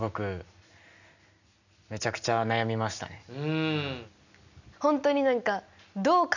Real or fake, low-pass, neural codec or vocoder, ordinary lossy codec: real; 7.2 kHz; none; none